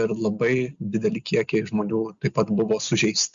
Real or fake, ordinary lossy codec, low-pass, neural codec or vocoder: real; Opus, 64 kbps; 7.2 kHz; none